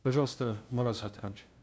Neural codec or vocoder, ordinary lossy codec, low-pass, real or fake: codec, 16 kHz, 0.5 kbps, FunCodec, trained on LibriTTS, 25 frames a second; none; none; fake